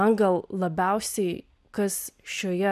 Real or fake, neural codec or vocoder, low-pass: real; none; 14.4 kHz